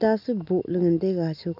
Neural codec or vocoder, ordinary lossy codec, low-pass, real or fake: none; none; 5.4 kHz; real